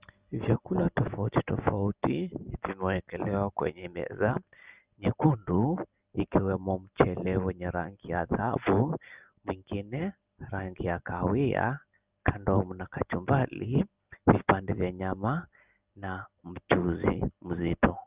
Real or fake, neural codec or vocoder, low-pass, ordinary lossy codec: real; none; 3.6 kHz; Opus, 24 kbps